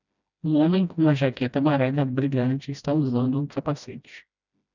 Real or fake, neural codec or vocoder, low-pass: fake; codec, 16 kHz, 1 kbps, FreqCodec, smaller model; 7.2 kHz